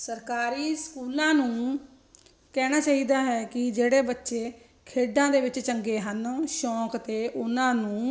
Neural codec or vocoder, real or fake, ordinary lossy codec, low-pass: none; real; none; none